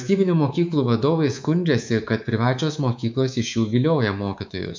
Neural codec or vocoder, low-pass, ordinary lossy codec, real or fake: codec, 24 kHz, 3.1 kbps, DualCodec; 7.2 kHz; MP3, 64 kbps; fake